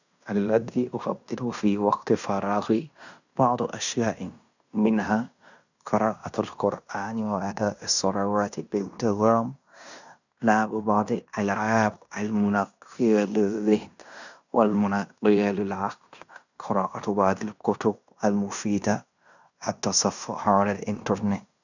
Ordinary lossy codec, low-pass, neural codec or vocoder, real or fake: none; 7.2 kHz; codec, 16 kHz in and 24 kHz out, 0.9 kbps, LongCat-Audio-Codec, fine tuned four codebook decoder; fake